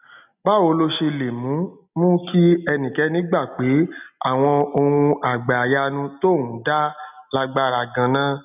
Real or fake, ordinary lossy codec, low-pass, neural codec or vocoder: real; none; 3.6 kHz; none